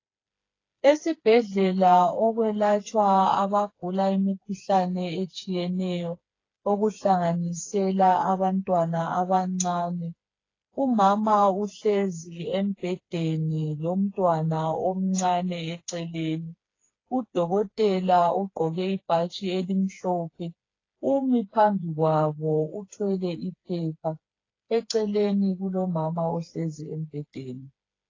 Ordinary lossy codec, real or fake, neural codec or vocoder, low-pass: AAC, 32 kbps; fake; codec, 16 kHz, 4 kbps, FreqCodec, smaller model; 7.2 kHz